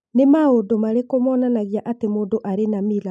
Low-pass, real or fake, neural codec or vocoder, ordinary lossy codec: none; real; none; none